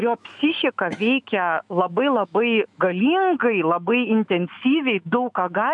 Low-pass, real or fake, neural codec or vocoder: 10.8 kHz; fake; autoencoder, 48 kHz, 128 numbers a frame, DAC-VAE, trained on Japanese speech